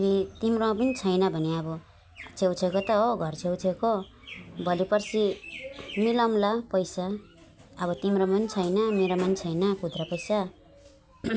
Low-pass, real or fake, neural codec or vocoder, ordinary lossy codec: none; real; none; none